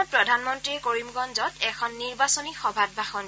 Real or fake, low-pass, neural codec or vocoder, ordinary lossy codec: real; none; none; none